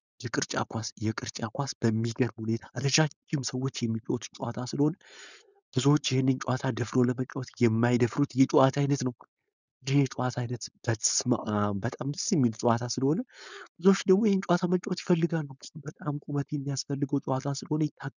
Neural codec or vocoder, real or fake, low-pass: codec, 16 kHz, 4.8 kbps, FACodec; fake; 7.2 kHz